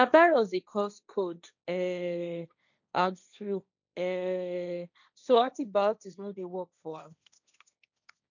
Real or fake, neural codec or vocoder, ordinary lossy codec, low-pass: fake; codec, 16 kHz, 1.1 kbps, Voila-Tokenizer; none; 7.2 kHz